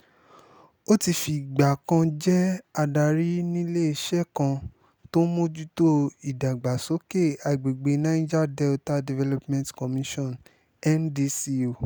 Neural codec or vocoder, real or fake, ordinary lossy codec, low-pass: none; real; none; none